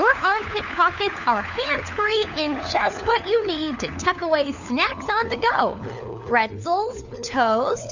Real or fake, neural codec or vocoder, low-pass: fake; codec, 16 kHz, 4 kbps, FunCodec, trained on LibriTTS, 50 frames a second; 7.2 kHz